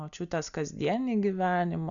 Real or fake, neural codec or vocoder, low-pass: real; none; 7.2 kHz